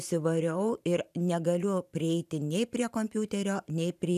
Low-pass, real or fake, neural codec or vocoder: 14.4 kHz; fake; vocoder, 44.1 kHz, 128 mel bands every 512 samples, BigVGAN v2